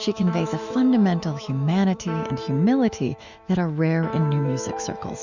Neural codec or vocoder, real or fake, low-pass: autoencoder, 48 kHz, 128 numbers a frame, DAC-VAE, trained on Japanese speech; fake; 7.2 kHz